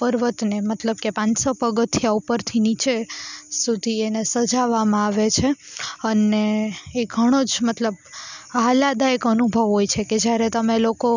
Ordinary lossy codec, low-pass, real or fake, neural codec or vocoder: none; 7.2 kHz; real; none